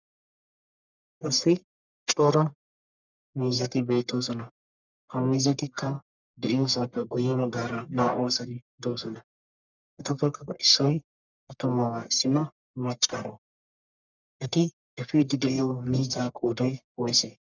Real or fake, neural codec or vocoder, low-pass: fake; codec, 44.1 kHz, 1.7 kbps, Pupu-Codec; 7.2 kHz